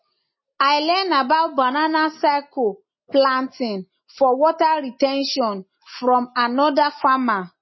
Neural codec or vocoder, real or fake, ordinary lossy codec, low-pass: none; real; MP3, 24 kbps; 7.2 kHz